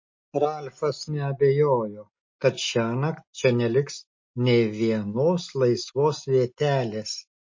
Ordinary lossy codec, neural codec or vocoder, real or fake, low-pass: MP3, 32 kbps; none; real; 7.2 kHz